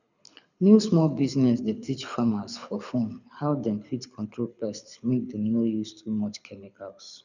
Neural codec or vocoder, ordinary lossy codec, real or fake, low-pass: codec, 24 kHz, 6 kbps, HILCodec; none; fake; 7.2 kHz